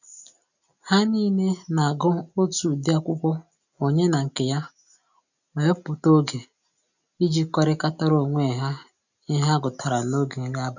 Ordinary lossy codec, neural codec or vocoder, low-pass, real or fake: none; none; 7.2 kHz; real